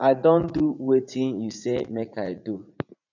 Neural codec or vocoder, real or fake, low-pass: vocoder, 44.1 kHz, 80 mel bands, Vocos; fake; 7.2 kHz